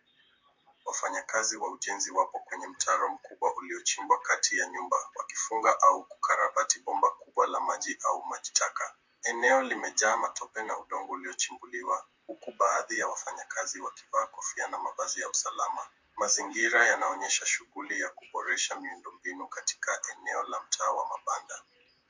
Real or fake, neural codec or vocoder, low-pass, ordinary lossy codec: fake; vocoder, 44.1 kHz, 128 mel bands, Pupu-Vocoder; 7.2 kHz; MP3, 48 kbps